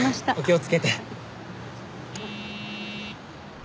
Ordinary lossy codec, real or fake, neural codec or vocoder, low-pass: none; real; none; none